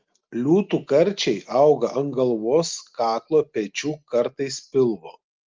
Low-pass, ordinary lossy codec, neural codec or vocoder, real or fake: 7.2 kHz; Opus, 32 kbps; none; real